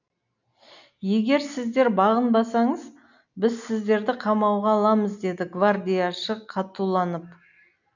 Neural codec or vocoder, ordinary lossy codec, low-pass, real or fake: none; none; 7.2 kHz; real